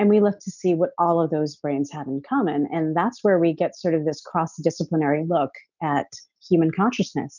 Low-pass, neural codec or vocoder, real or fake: 7.2 kHz; none; real